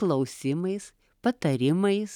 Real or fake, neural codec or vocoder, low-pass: real; none; 19.8 kHz